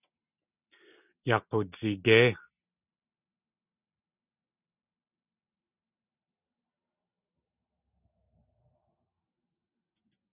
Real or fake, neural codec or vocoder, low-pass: real; none; 3.6 kHz